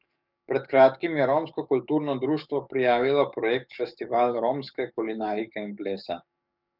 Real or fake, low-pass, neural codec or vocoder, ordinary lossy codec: fake; 5.4 kHz; codec, 44.1 kHz, 7.8 kbps, DAC; none